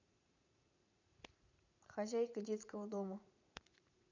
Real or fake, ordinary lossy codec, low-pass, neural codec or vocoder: fake; none; 7.2 kHz; vocoder, 44.1 kHz, 80 mel bands, Vocos